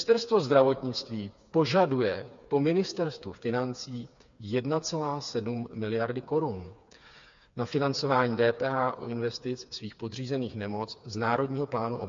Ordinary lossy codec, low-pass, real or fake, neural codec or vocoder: MP3, 48 kbps; 7.2 kHz; fake; codec, 16 kHz, 4 kbps, FreqCodec, smaller model